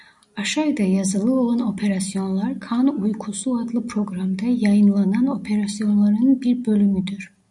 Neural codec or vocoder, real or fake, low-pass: none; real; 10.8 kHz